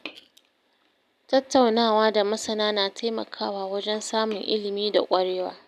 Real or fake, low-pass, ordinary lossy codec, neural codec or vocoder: real; 14.4 kHz; none; none